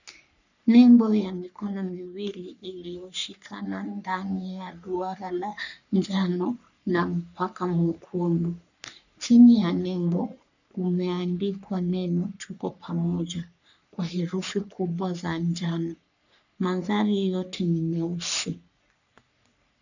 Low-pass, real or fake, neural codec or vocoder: 7.2 kHz; fake; codec, 44.1 kHz, 3.4 kbps, Pupu-Codec